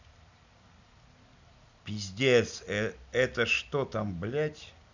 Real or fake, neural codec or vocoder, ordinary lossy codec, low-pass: real; none; none; 7.2 kHz